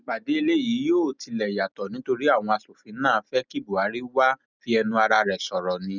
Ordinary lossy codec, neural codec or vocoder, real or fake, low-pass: none; none; real; 7.2 kHz